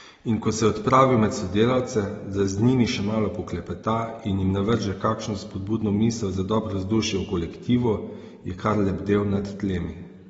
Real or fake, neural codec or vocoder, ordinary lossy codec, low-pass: real; none; AAC, 24 kbps; 19.8 kHz